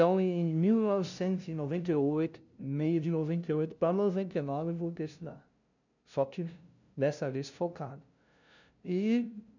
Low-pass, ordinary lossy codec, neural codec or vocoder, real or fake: 7.2 kHz; none; codec, 16 kHz, 0.5 kbps, FunCodec, trained on LibriTTS, 25 frames a second; fake